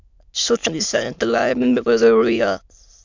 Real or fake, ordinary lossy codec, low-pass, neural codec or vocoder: fake; MP3, 64 kbps; 7.2 kHz; autoencoder, 22.05 kHz, a latent of 192 numbers a frame, VITS, trained on many speakers